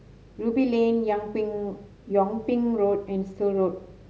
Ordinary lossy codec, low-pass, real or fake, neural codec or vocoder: none; none; real; none